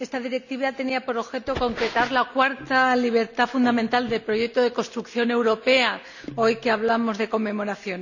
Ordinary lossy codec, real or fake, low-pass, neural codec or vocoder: none; real; 7.2 kHz; none